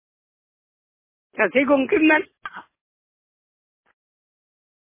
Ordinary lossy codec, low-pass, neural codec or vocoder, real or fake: MP3, 16 kbps; 3.6 kHz; none; real